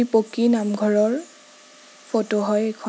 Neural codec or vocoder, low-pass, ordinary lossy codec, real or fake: none; none; none; real